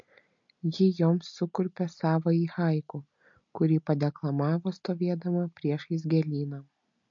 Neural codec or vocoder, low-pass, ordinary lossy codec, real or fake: none; 7.2 kHz; MP3, 48 kbps; real